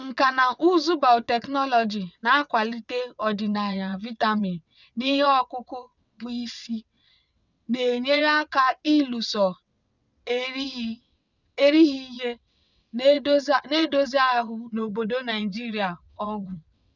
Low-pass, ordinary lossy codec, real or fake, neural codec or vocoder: 7.2 kHz; none; fake; vocoder, 22.05 kHz, 80 mel bands, WaveNeXt